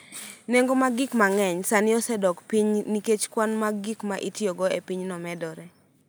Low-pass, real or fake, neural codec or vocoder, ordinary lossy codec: none; real; none; none